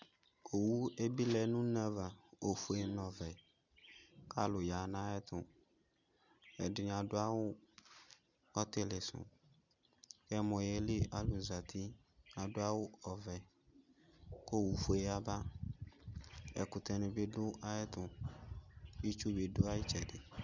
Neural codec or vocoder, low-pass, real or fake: none; 7.2 kHz; real